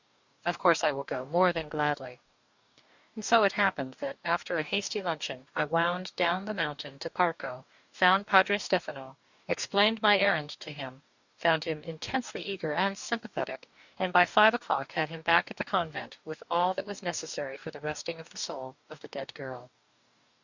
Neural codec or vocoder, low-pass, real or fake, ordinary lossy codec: codec, 44.1 kHz, 2.6 kbps, DAC; 7.2 kHz; fake; Opus, 64 kbps